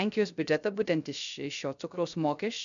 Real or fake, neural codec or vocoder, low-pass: fake; codec, 16 kHz, 0.3 kbps, FocalCodec; 7.2 kHz